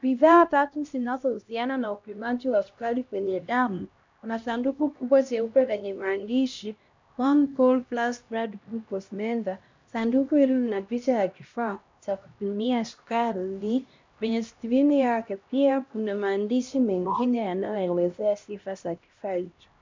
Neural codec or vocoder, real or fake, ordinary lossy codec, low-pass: codec, 16 kHz, 1 kbps, X-Codec, HuBERT features, trained on LibriSpeech; fake; MP3, 64 kbps; 7.2 kHz